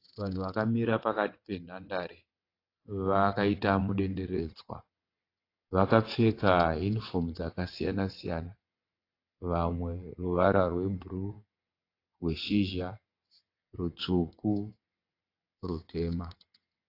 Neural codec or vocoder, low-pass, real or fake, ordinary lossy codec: vocoder, 22.05 kHz, 80 mel bands, WaveNeXt; 5.4 kHz; fake; AAC, 32 kbps